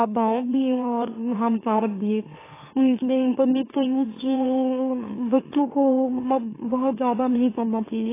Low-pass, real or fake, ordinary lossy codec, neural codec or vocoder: 3.6 kHz; fake; AAC, 16 kbps; autoencoder, 44.1 kHz, a latent of 192 numbers a frame, MeloTTS